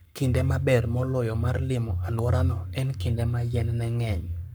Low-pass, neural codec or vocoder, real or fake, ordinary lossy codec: none; codec, 44.1 kHz, 7.8 kbps, Pupu-Codec; fake; none